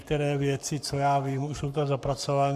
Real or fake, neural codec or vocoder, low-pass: fake; codec, 44.1 kHz, 7.8 kbps, Pupu-Codec; 14.4 kHz